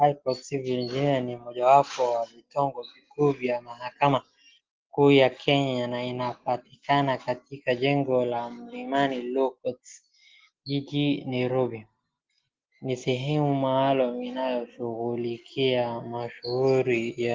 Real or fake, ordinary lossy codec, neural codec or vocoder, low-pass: real; Opus, 16 kbps; none; 7.2 kHz